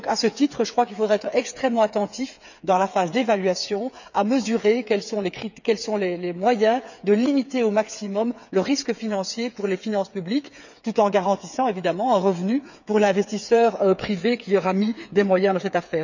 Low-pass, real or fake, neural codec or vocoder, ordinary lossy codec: 7.2 kHz; fake; codec, 16 kHz, 8 kbps, FreqCodec, smaller model; none